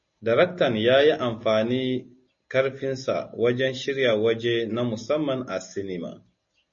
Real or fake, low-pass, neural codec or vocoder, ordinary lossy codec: real; 7.2 kHz; none; MP3, 32 kbps